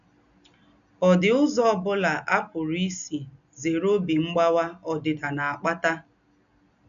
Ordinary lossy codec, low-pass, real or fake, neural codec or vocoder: none; 7.2 kHz; real; none